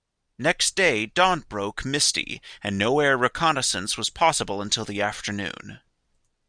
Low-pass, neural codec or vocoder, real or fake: 9.9 kHz; none; real